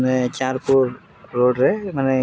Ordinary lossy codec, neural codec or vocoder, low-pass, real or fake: none; none; none; real